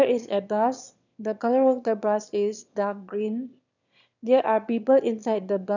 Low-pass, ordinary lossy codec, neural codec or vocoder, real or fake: 7.2 kHz; none; autoencoder, 22.05 kHz, a latent of 192 numbers a frame, VITS, trained on one speaker; fake